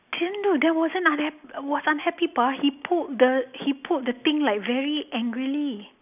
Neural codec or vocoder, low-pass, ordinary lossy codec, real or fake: none; 3.6 kHz; none; real